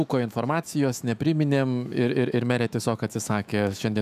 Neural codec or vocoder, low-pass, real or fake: autoencoder, 48 kHz, 128 numbers a frame, DAC-VAE, trained on Japanese speech; 14.4 kHz; fake